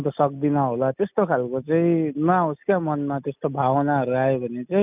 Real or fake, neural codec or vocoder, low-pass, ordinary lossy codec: real; none; 3.6 kHz; none